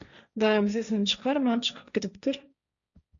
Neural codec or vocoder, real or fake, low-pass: codec, 16 kHz, 1.1 kbps, Voila-Tokenizer; fake; 7.2 kHz